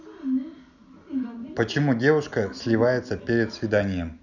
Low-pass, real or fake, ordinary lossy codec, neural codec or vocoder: 7.2 kHz; real; none; none